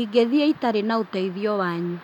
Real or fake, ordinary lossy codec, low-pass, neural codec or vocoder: real; none; 19.8 kHz; none